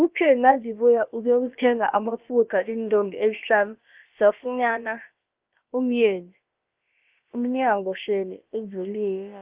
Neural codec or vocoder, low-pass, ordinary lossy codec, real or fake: codec, 16 kHz, about 1 kbps, DyCAST, with the encoder's durations; 3.6 kHz; Opus, 32 kbps; fake